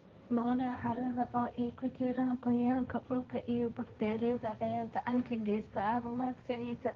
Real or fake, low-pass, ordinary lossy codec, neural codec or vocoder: fake; 7.2 kHz; Opus, 32 kbps; codec, 16 kHz, 1.1 kbps, Voila-Tokenizer